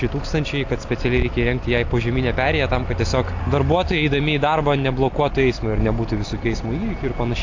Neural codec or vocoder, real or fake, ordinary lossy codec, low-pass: none; real; AAC, 48 kbps; 7.2 kHz